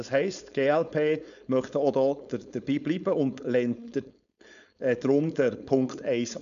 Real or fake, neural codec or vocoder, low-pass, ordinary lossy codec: fake; codec, 16 kHz, 4.8 kbps, FACodec; 7.2 kHz; none